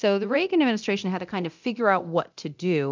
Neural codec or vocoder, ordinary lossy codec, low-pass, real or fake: codec, 24 kHz, 0.9 kbps, DualCodec; MP3, 64 kbps; 7.2 kHz; fake